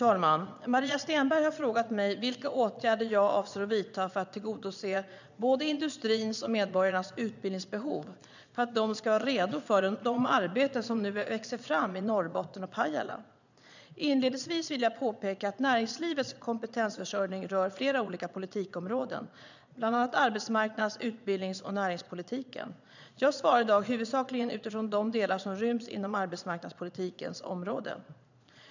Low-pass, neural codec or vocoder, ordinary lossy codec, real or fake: 7.2 kHz; vocoder, 22.05 kHz, 80 mel bands, Vocos; none; fake